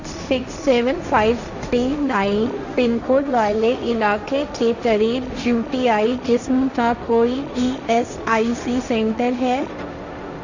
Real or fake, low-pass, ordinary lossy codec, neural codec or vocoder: fake; 7.2 kHz; none; codec, 16 kHz, 1.1 kbps, Voila-Tokenizer